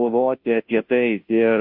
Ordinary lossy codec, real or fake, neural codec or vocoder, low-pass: MP3, 48 kbps; fake; codec, 16 kHz, 0.5 kbps, FunCodec, trained on Chinese and English, 25 frames a second; 5.4 kHz